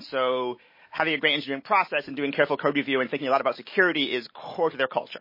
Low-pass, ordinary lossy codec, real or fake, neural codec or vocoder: 5.4 kHz; MP3, 24 kbps; real; none